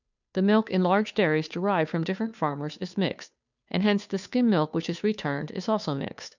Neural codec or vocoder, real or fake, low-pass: codec, 16 kHz, 2 kbps, FunCodec, trained on Chinese and English, 25 frames a second; fake; 7.2 kHz